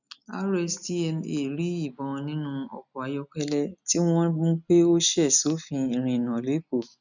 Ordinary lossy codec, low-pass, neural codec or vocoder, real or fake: none; 7.2 kHz; none; real